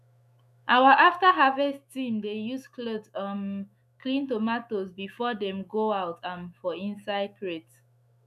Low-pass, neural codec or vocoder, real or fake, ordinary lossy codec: 14.4 kHz; autoencoder, 48 kHz, 128 numbers a frame, DAC-VAE, trained on Japanese speech; fake; none